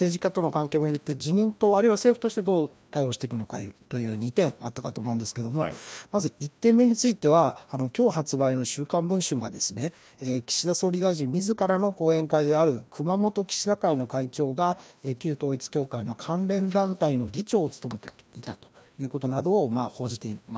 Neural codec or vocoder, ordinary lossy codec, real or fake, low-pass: codec, 16 kHz, 1 kbps, FreqCodec, larger model; none; fake; none